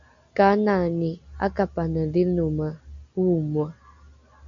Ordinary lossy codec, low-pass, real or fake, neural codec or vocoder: MP3, 96 kbps; 7.2 kHz; real; none